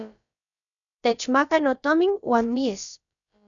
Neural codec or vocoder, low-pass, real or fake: codec, 16 kHz, about 1 kbps, DyCAST, with the encoder's durations; 7.2 kHz; fake